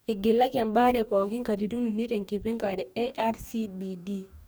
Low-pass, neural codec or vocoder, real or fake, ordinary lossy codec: none; codec, 44.1 kHz, 2.6 kbps, DAC; fake; none